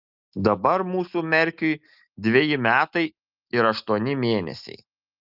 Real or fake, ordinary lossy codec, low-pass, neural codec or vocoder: real; Opus, 24 kbps; 5.4 kHz; none